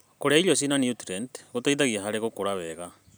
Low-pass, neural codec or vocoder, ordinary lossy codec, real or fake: none; none; none; real